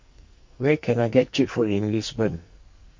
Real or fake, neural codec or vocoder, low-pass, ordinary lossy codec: fake; codec, 44.1 kHz, 2.6 kbps, SNAC; 7.2 kHz; MP3, 48 kbps